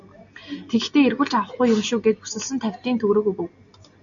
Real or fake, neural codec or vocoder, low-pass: real; none; 7.2 kHz